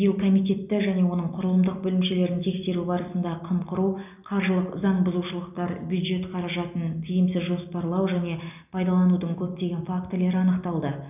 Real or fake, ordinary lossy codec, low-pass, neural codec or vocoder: real; none; 3.6 kHz; none